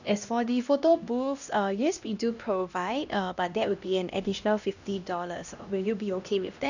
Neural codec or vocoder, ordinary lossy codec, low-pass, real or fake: codec, 16 kHz, 1 kbps, X-Codec, HuBERT features, trained on LibriSpeech; none; 7.2 kHz; fake